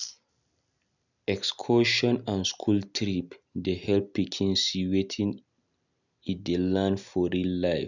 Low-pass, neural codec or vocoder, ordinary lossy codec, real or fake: 7.2 kHz; none; none; real